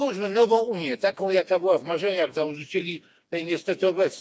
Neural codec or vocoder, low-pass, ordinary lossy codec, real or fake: codec, 16 kHz, 2 kbps, FreqCodec, smaller model; none; none; fake